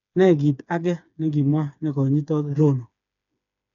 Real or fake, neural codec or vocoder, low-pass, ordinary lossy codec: fake; codec, 16 kHz, 4 kbps, FreqCodec, smaller model; 7.2 kHz; none